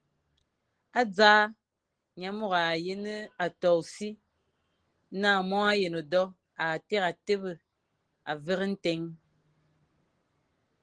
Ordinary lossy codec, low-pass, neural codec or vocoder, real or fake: Opus, 16 kbps; 9.9 kHz; none; real